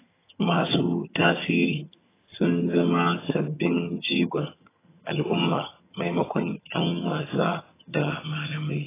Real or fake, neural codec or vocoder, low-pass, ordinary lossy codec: fake; vocoder, 22.05 kHz, 80 mel bands, HiFi-GAN; 3.6 kHz; AAC, 16 kbps